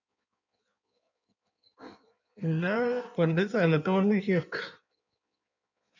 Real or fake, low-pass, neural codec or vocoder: fake; 7.2 kHz; codec, 16 kHz in and 24 kHz out, 1.1 kbps, FireRedTTS-2 codec